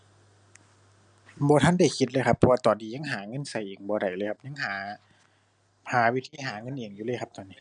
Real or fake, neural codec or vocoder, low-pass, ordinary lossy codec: real; none; 9.9 kHz; none